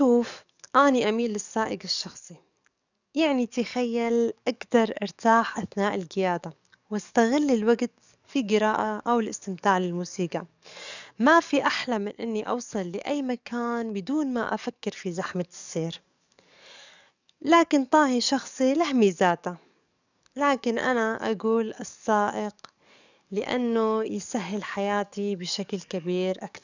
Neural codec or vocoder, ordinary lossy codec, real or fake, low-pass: codec, 44.1 kHz, 7.8 kbps, DAC; none; fake; 7.2 kHz